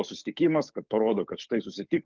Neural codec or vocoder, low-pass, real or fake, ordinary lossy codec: codec, 44.1 kHz, 7.8 kbps, DAC; 7.2 kHz; fake; Opus, 32 kbps